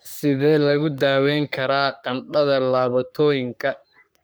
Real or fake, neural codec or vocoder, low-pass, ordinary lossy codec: fake; codec, 44.1 kHz, 3.4 kbps, Pupu-Codec; none; none